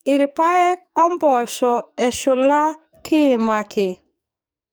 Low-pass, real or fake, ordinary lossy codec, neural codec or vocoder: none; fake; none; codec, 44.1 kHz, 2.6 kbps, SNAC